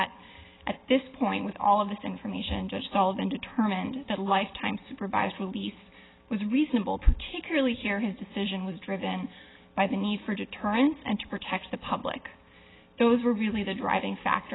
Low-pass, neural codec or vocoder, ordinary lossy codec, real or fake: 7.2 kHz; none; AAC, 16 kbps; real